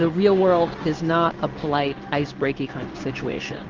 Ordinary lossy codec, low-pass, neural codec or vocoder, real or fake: Opus, 32 kbps; 7.2 kHz; codec, 16 kHz in and 24 kHz out, 1 kbps, XY-Tokenizer; fake